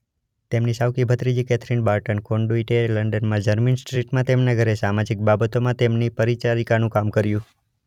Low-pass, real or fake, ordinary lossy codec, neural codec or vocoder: 19.8 kHz; fake; none; vocoder, 44.1 kHz, 128 mel bands every 256 samples, BigVGAN v2